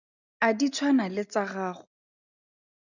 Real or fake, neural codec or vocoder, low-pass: real; none; 7.2 kHz